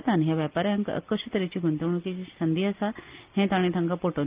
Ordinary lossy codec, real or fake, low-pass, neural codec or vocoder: Opus, 32 kbps; real; 3.6 kHz; none